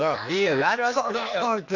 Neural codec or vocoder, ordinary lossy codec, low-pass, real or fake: codec, 16 kHz, 0.8 kbps, ZipCodec; none; 7.2 kHz; fake